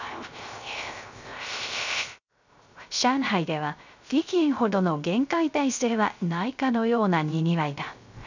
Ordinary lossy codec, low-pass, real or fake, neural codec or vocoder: none; 7.2 kHz; fake; codec, 16 kHz, 0.3 kbps, FocalCodec